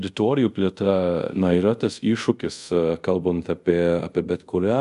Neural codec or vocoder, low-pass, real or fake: codec, 24 kHz, 0.5 kbps, DualCodec; 10.8 kHz; fake